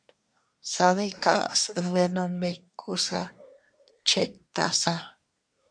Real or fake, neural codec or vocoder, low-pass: fake; codec, 24 kHz, 1 kbps, SNAC; 9.9 kHz